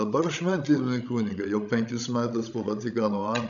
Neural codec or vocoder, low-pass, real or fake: codec, 16 kHz, 16 kbps, FreqCodec, larger model; 7.2 kHz; fake